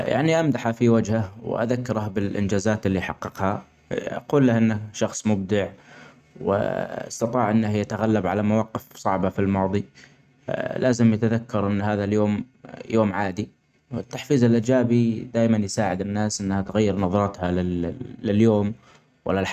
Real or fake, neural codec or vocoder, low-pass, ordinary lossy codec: real; none; 14.4 kHz; none